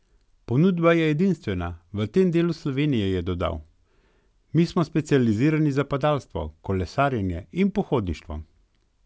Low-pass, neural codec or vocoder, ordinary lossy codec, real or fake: none; none; none; real